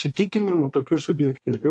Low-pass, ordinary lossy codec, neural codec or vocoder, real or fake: 10.8 kHz; AAC, 64 kbps; codec, 24 kHz, 1 kbps, SNAC; fake